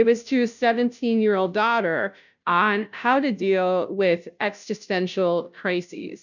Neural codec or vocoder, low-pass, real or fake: codec, 16 kHz, 0.5 kbps, FunCodec, trained on Chinese and English, 25 frames a second; 7.2 kHz; fake